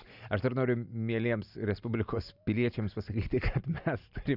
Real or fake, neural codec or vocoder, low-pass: real; none; 5.4 kHz